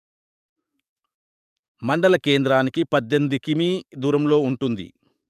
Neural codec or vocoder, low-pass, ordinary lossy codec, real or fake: codec, 44.1 kHz, 7.8 kbps, DAC; 14.4 kHz; none; fake